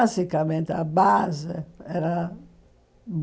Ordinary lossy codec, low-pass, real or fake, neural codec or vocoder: none; none; real; none